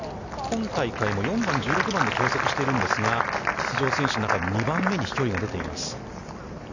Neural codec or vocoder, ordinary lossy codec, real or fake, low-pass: none; none; real; 7.2 kHz